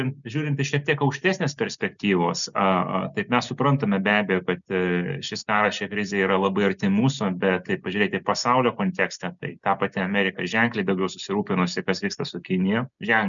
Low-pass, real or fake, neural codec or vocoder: 7.2 kHz; real; none